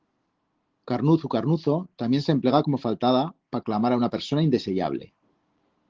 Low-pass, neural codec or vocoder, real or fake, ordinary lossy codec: 7.2 kHz; none; real; Opus, 16 kbps